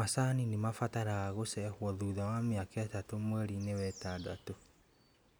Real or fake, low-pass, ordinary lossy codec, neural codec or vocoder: real; none; none; none